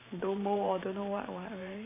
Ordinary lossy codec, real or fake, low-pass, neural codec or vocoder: AAC, 24 kbps; fake; 3.6 kHz; vocoder, 44.1 kHz, 128 mel bands every 512 samples, BigVGAN v2